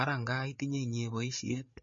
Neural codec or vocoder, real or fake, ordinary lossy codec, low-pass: codec, 16 kHz, 8 kbps, FreqCodec, larger model; fake; MP3, 32 kbps; 7.2 kHz